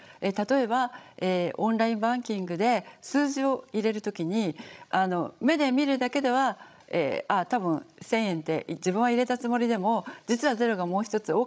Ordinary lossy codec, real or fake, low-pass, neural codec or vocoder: none; fake; none; codec, 16 kHz, 16 kbps, FreqCodec, larger model